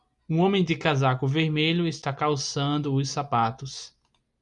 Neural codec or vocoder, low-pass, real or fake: none; 10.8 kHz; real